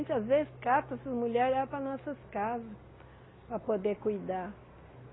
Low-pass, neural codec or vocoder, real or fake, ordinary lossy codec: 7.2 kHz; none; real; AAC, 16 kbps